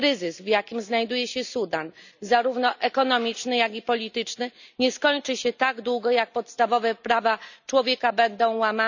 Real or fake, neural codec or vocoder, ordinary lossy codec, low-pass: real; none; none; 7.2 kHz